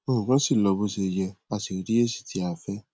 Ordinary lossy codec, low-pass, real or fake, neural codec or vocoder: none; none; real; none